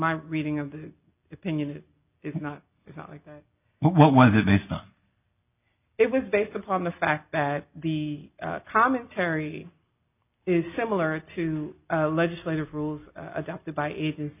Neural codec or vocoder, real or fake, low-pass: none; real; 3.6 kHz